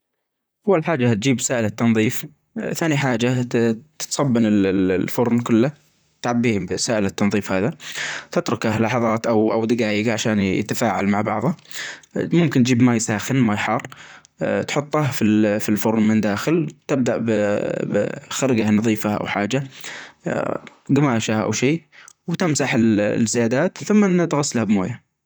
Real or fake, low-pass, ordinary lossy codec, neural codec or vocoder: fake; none; none; vocoder, 44.1 kHz, 128 mel bands, Pupu-Vocoder